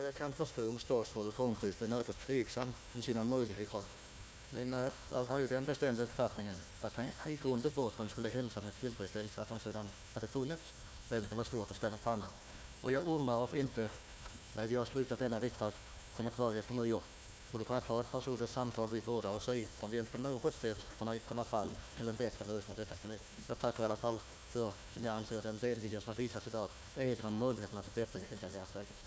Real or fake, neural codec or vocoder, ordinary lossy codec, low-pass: fake; codec, 16 kHz, 1 kbps, FunCodec, trained on Chinese and English, 50 frames a second; none; none